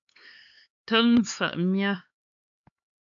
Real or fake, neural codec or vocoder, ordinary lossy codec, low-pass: fake; codec, 16 kHz, 4 kbps, X-Codec, HuBERT features, trained on LibriSpeech; AAC, 64 kbps; 7.2 kHz